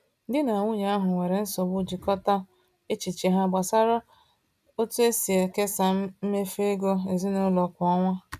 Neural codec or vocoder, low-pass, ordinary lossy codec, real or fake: none; 14.4 kHz; none; real